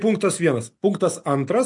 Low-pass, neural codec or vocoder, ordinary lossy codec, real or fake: 10.8 kHz; none; MP3, 64 kbps; real